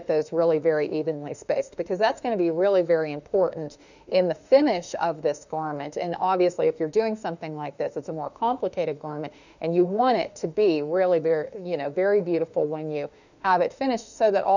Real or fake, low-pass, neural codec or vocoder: fake; 7.2 kHz; autoencoder, 48 kHz, 32 numbers a frame, DAC-VAE, trained on Japanese speech